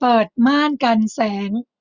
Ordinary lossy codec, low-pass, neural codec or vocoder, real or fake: none; 7.2 kHz; none; real